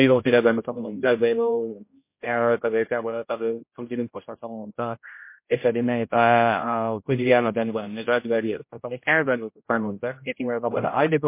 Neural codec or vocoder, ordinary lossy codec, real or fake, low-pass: codec, 16 kHz, 0.5 kbps, X-Codec, HuBERT features, trained on general audio; MP3, 24 kbps; fake; 3.6 kHz